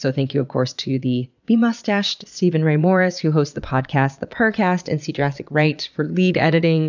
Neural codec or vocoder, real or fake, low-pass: vocoder, 22.05 kHz, 80 mel bands, Vocos; fake; 7.2 kHz